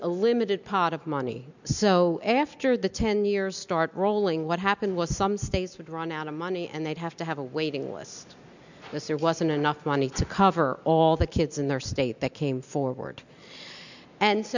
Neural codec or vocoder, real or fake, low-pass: none; real; 7.2 kHz